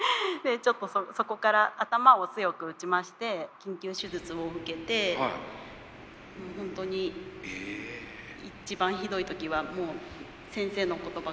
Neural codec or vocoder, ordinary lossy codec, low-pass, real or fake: none; none; none; real